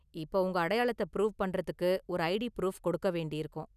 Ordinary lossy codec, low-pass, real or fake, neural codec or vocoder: none; 14.4 kHz; real; none